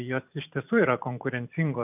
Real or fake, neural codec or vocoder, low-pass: fake; vocoder, 44.1 kHz, 128 mel bands every 512 samples, BigVGAN v2; 3.6 kHz